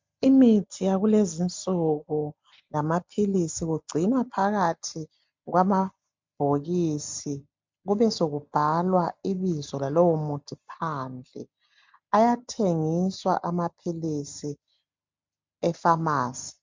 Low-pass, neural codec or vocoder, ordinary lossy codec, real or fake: 7.2 kHz; none; MP3, 64 kbps; real